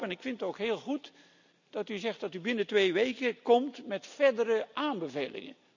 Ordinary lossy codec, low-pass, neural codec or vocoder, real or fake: none; 7.2 kHz; none; real